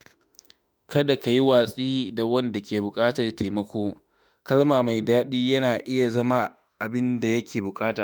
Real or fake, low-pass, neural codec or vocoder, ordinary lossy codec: fake; none; autoencoder, 48 kHz, 32 numbers a frame, DAC-VAE, trained on Japanese speech; none